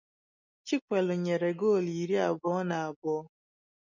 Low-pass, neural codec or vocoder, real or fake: 7.2 kHz; none; real